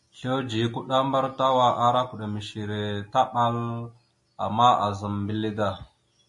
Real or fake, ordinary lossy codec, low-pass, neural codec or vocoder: real; MP3, 48 kbps; 10.8 kHz; none